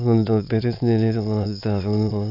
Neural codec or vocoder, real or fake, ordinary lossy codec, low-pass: autoencoder, 22.05 kHz, a latent of 192 numbers a frame, VITS, trained on many speakers; fake; none; 5.4 kHz